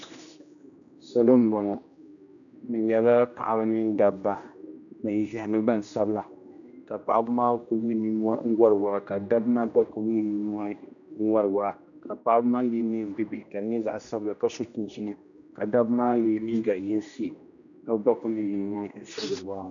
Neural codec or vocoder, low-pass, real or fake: codec, 16 kHz, 1 kbps, X-Codec, HuBERT features, trained on general audio; 7.2 kHz; fake